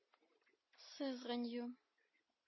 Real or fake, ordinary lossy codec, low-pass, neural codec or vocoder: real; MP3, 24 kbps; 7.2 kHz; none